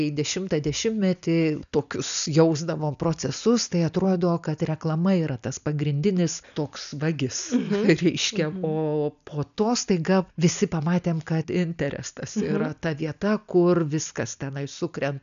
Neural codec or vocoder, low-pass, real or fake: none; 7.2 kHz; real